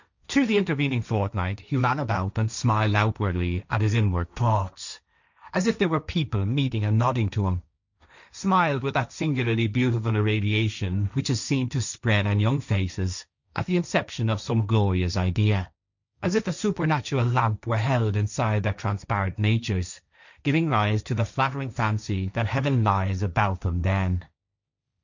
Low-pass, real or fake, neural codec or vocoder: 7.2 kHz; fake; codec, 16 kHz, 1.1 kbps, Voila-Tokenizer